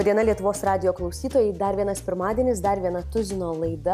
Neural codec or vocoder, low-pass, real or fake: none; 14.4 kHz; real